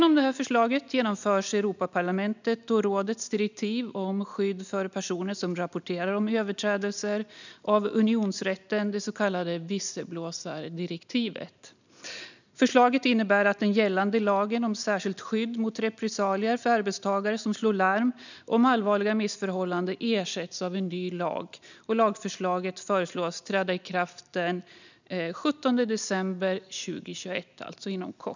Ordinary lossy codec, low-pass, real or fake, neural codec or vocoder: none; 7.2 kHz; real; none